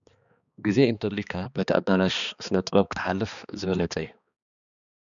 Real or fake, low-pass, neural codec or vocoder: fake; 7.2 kHz; codec, 16 kHz, 2 kbps, X-Codec, HuBERT features, trained on balanced general audio